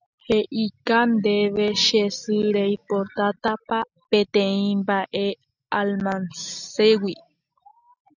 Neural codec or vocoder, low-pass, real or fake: none; 7.2 kHz; real